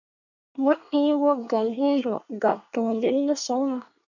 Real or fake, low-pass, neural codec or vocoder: fake; 7.2 kHz; codec, 24 kHz, 1 kbps, SNAC